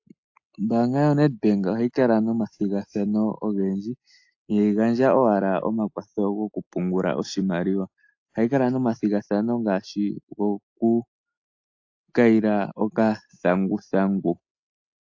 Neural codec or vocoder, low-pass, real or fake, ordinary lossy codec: none; 7.2 kHz; real; AAC, 48 kbps